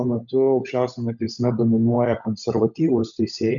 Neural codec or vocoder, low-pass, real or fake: codec, 16 kHz, 16 kbps, FunCodec, trained on LibriTTS, 50 frames a second; 7.2 kHz; fake